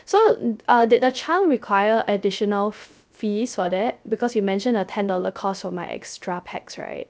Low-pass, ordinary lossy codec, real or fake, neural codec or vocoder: none; none; fake; codec, 16 kHz, 0.3 kbps, FocalCodec